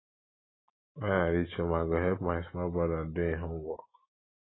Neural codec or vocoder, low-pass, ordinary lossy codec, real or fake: none; 7.2 kHz; AAC, 16 kbps; real